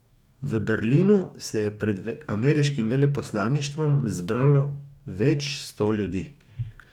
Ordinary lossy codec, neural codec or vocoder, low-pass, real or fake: none; codec, 44.1 kHz, 2.6 kbps, DAC; 19.8 kHz; fake